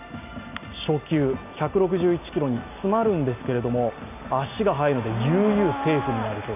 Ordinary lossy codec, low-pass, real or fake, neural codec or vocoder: none; 3.6 kHz; real; none